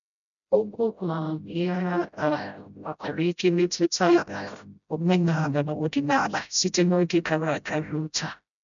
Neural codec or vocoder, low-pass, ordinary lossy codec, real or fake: codec, 16 kHz, 0.5 kbps, FreqCodec, smaller model; 7.2 kHz; none; fake